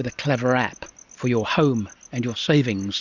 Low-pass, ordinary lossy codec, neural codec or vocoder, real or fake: 7.2 kHz; Opus, 64 kbps; none; real